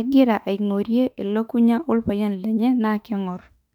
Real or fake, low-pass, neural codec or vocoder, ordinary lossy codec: fake; 19.8 kHz; autoencoder, 48 kHz, 32 numbers a frame, DAC-VAE, trained on Japanese speech; none